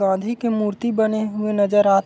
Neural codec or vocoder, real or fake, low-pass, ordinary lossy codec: none; real; none; none